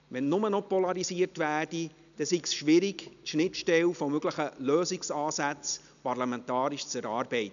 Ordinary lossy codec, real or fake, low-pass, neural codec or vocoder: none; real; 7.2 kHz; none